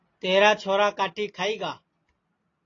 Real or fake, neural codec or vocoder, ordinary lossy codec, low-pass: real; none; AAC, 32 kbps; 7.2 kHz